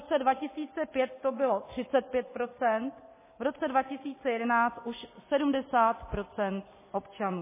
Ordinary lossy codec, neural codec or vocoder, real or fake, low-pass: MP3, 16 kbps; none; real; 3.6 kHz